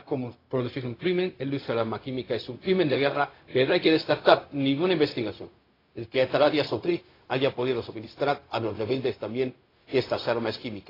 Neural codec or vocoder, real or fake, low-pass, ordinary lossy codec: codec, 16 kHz, 0.4 kbps, LongCat-Audio-Codec; fake; 5.4 kHz; AAC, 24 kbps